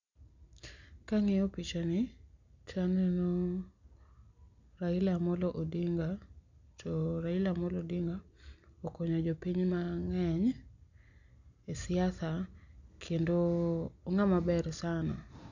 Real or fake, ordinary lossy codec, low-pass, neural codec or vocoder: real; none; 7.2 kHz; none